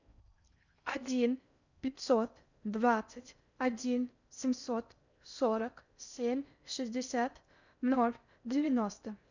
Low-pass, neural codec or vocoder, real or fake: 7.2 kHz; codec, 16 kHz in and 24 kHz out, 0.6 kbps, FocalCodec, streaming, 4096 codes; fake